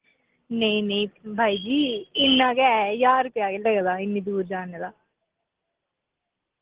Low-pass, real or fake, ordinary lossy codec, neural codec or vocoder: 3.6 kHz; real; Opus, 16 kbps; none